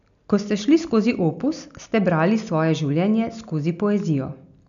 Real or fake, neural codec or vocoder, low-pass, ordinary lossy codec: real; none; 7.2 kHz; none